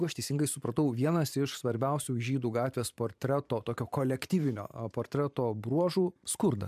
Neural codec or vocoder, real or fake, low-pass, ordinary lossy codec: vocoder, 44.1 kHz, 128 mel bands every 512 samples, BigVGAN v2; fake; 14.4 kHz; MP3, 96 kbps